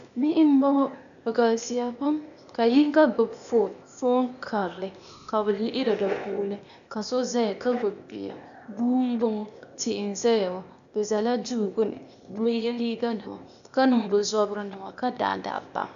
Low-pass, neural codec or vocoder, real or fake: 7.2 kHz; codec, 16 kHz, 0.8 kbps, ZipCodec; fake